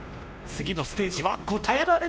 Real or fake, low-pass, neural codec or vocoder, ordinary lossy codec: fake; none; codec, 16 kHz, 1 kbps, X-Codec, WavLM features, trained on Multilingual LibriSpeech; none